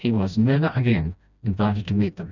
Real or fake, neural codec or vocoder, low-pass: fake; codec, 16 kHz, 1 kbps, FreqCodec, smaller model; 7.2 kHz